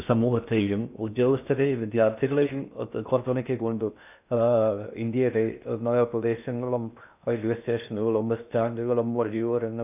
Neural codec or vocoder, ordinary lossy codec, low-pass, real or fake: codec, 16 kHz in and 24 kHz out, 0.6 kbps, FocalCodec, streaming, 4096 codes; none; 3.6 kHz; fake